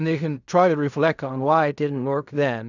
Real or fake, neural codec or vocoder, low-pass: fake; codec, 16 kHz in and 24 kHz out, 0.4 kbps, LongCat-Audio-Codec, fine tuned four codebook decoder; 7.2 kHz